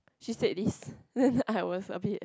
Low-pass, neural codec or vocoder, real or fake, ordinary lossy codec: none; none; real; none